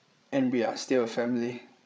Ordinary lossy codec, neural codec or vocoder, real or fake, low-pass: none; codec, 16 kHz, 16 kbps, FreqCodec, larger model; fake; none